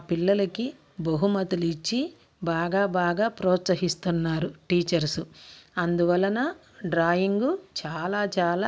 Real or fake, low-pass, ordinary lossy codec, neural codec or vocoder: real; none; none; none